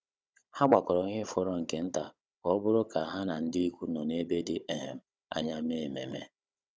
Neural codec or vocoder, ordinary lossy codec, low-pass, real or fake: codec, 16 kHz, 16 kbps, FunCodec, trained on Chinese and English, 50 frames a second; none; none; fake